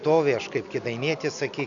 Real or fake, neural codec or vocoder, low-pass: real; none; 7.2 kHz